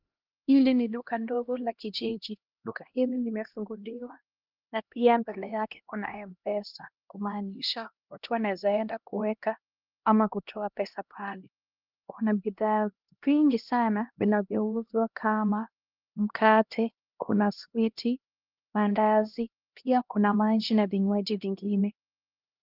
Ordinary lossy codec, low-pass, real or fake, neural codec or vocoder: Opus, 32 kbps; 5.4 kHz; fake; codec, 16 kHz, 1 kbps, X-Codec, HuBERT features, trained on LibriSpeech